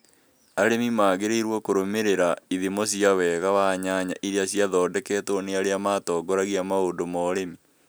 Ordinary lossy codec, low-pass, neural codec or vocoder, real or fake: none; none; none; real